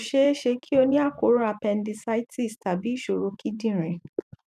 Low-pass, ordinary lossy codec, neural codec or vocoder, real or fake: 14.4 kHz; none; vocoder, 44.1 kHz, 128 mel bands every 512 samples, BigVGAN v2; fake